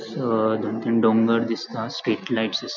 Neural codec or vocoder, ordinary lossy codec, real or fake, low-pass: none; none; real; 7.2 kHz